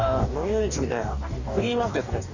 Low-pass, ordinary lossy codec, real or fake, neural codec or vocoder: 7.2 kHz; none; fake; codec, 44.1 kHz, 2.6 kbps, DAC